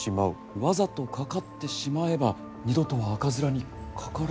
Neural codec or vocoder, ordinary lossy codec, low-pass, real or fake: none; none; none; real